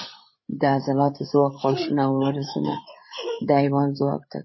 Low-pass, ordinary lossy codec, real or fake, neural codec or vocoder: 7.2 kHz; MP3, 24 kbps; fake; codec, 16 kHz, 8 kbps, FreqCodec, larger model